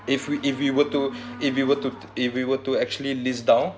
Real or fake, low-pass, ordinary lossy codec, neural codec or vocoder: real; none; none; none